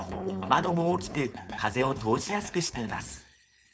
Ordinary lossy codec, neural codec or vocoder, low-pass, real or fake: none; codec, 16 kHz, 4.8 kbps, FACodec; none; fake